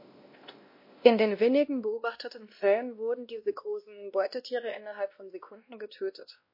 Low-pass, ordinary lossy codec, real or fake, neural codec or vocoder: 5.4 kHz; MP3, 32 kbps; fake; codec, 16 kHz, 1 kbps, X-Codec, WavLM features, trained on Multilingual LibriSpeech